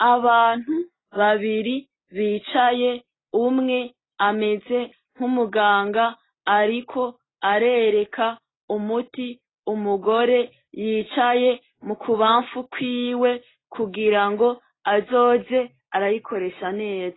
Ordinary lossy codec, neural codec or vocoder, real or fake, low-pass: AAC, 16 kbps; none; real; 7.2 kHz